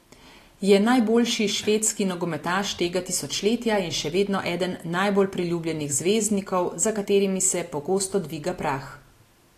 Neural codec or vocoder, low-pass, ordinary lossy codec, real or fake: none; 14.4 kHz; AAC, 48 kbps; real